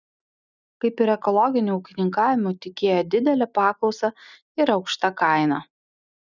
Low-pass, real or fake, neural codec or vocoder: 7.2 kHz; real; none